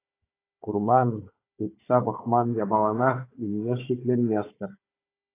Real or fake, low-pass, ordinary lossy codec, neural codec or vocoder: fake; 3.6 kHz; AAC, 24 kbps; codec, 16 kHz, 4 kbps, FunCodec, trained on Chinese and English, 50 frames a second